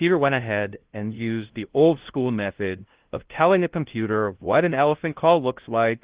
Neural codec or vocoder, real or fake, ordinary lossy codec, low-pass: codec, 16 kHz, 0.5 kbps, FunCodec, trained on LibriTTS, 25 frames a second; fake; Opus, 16 kbps; 3.6 kHz